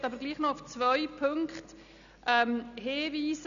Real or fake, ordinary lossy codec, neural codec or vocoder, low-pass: real; none; none; 7.2 kHz